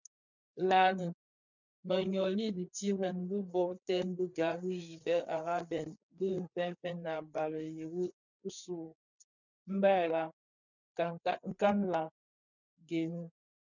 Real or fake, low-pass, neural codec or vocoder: fake; 7.2 kHz; codec, 16 kHz, 4 kbps, FreqCodec, larger model